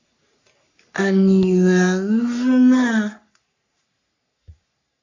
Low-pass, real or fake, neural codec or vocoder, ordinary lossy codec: 7.2 kHz; fake; codec, 44.1 kHz, 3.4 kbps, Pupu-Codec; AAC, 48 kbps